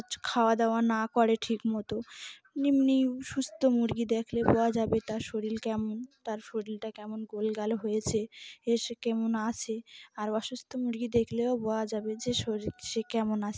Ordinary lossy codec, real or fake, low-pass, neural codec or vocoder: none; real; none; none